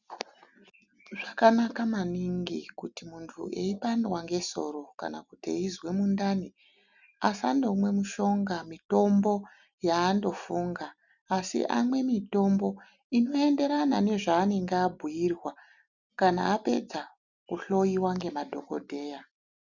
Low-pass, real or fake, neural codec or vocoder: 7.2 kHz; real; none